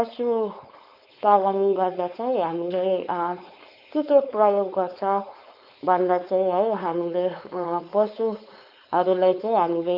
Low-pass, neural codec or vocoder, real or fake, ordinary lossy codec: 5.4 kHz; codec, 16 kHz, 4.8 kbps, FACodec; fake; Opus, 64 kbps